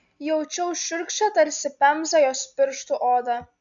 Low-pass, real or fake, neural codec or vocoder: 7.2 kHz; real; none